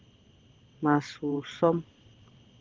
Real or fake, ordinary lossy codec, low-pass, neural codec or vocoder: fake; Opus, 16 kbps; 7.2 kHz; vocoder, 22.05 kHz, 80 mel bands, WaveNeXt